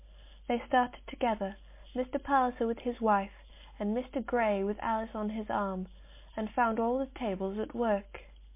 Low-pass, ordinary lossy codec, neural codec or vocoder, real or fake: 3.6 kHz; MP3, 24 kbps; none; real